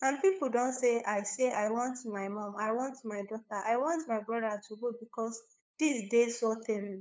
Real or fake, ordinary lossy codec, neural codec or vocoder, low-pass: fake; none; codec, 16 kHz, 8 kbps, FunCodec, trained on LibriTTS, 25 frames a second; none